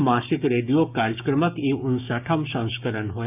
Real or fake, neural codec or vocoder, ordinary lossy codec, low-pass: fake; codec, 16 kHz, 6 kbps, DAC; none; 3.6 kHz